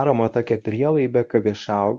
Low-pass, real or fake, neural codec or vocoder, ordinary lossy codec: 10.8 kHz; fake; codec, 24 kHz, 0.9 kbps, WavTokenizer, medium speech release version 1; Opus, 64 kbps